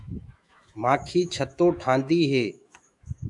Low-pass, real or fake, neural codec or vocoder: 10.8 kHz; fake; autoencoder, 48 kHz, 128 numbers a frame, DAC-VAE, trained on Japanese speech